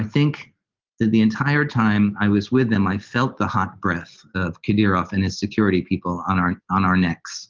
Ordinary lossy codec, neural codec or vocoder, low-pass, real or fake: Opus, 32 kbps; none; 7.2 kHz; real